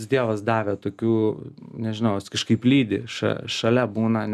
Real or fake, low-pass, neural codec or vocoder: real; 14.4 kHz; none